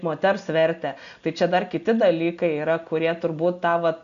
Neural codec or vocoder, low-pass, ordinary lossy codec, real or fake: none; 7.2 kHz; MP3, 96 kbps; real